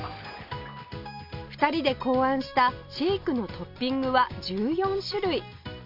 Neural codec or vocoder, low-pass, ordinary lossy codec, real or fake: none; 5.4 kHz; MP3, 48 kbps; real